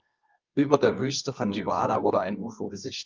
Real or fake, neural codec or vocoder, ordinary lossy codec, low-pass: fake; codec, 24 kHz, 0.9 kbps, WavTokenizer, medium music audio release; Opus, 24 kbps; 7.2 kHz